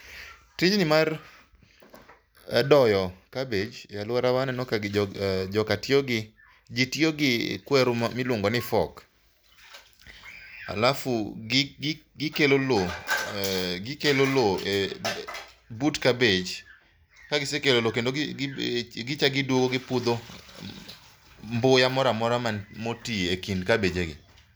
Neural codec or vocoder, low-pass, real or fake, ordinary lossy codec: none; none; real; none